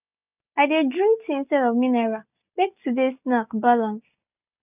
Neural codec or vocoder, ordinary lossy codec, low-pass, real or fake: none; none; 3.6 kHz; real